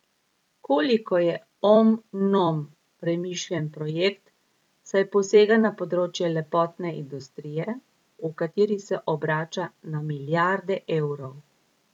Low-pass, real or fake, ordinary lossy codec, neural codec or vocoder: 19.8 kHz; fake; none; vocoder, 44.1 kHz, 128 mel bands every 256 samples, BigVGAN v2